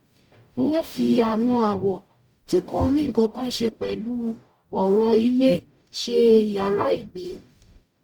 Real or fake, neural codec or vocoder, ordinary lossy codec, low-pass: fake; codec, 44.1 kHz, 0.9 kbps, DAC; Opus, 64 kbps; 19.8 kHz